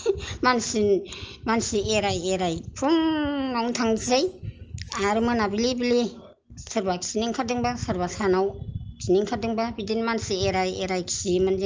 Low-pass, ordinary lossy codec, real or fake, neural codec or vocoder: 7.2 kHz; Opus, 24 kbps; real; none